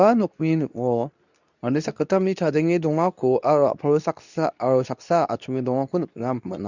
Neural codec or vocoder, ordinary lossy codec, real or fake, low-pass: codec, 24 kHz, 0.9 kbps, WavTokenizer, medium speech release version 2; none; fake; 7.2 kHz